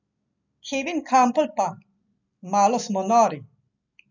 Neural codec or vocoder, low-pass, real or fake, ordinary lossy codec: none; 7.2 kHz; real; none